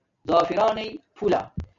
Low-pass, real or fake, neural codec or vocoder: 7.2 kHz; real; none